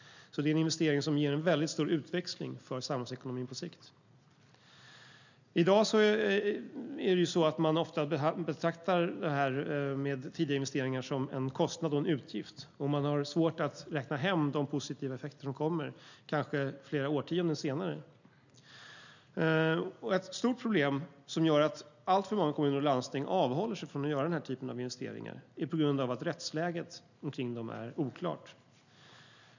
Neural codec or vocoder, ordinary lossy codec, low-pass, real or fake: none; none; 7.2 kHz; real